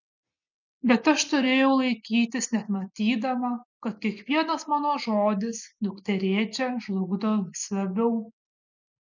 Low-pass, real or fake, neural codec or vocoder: 7.2 kHz; real; none